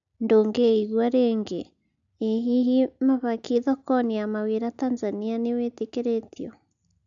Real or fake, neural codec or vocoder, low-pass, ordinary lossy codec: real; none; 7.2 kHz; AAC, 64 kbps